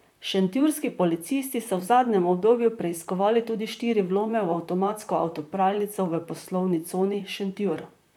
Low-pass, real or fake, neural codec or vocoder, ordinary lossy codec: 19.8 kHz; fake; vocoder, 44.1 kHz, 128 mel bands, Pupu-Vocoder; none